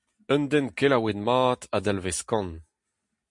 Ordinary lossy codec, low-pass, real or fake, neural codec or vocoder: MP3, 64 kbps; 10.8 kHz; real; none